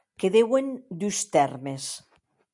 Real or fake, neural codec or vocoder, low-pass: real; none; 10.8 kHz